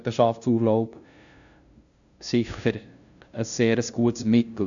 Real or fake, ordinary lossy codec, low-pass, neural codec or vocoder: fake; none; 7.2 kHz; codec, 16 kHz, 0.5 kbps, FunCodec, trained on LibriTTS, 25 frames a second